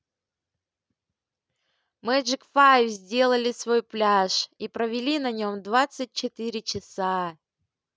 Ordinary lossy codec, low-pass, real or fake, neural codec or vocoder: none; none; real; none